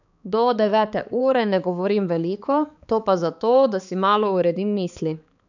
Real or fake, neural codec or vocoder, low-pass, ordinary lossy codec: fake; codec, 16 kHz, 4 kbps, X-Codec, HuBERT features, trained on balanced general audio; 7.2 kHz; none